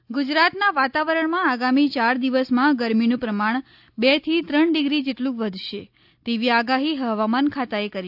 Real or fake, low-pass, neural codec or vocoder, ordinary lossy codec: real; 5.4 kHz; none; AAC, 48 kbps